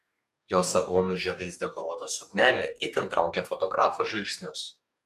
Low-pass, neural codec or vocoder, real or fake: 14.4 kHz; codec, 44.1 kHz, 2.6 kbps, DAC; fake